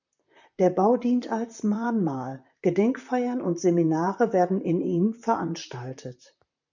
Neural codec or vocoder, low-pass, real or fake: vocoder, 44.1 kHz, 128 mel bands, Pupu-Vocoder; 7.2 kHz; fake